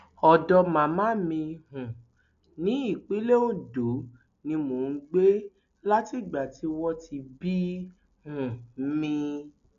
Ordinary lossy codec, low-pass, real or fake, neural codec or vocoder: none; 7.2 kHz; real; none